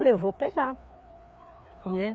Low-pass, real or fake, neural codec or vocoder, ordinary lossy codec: none; fake; codec, 16 kHz, 2 kbps, FreqCodec, larger model; none